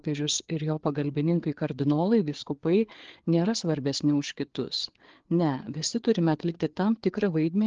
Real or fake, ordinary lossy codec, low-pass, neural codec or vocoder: fake; Opus, 16 kbps; 7.2 kHz; codec, 16 kHz, 4 kbps, FreqCodec, larger model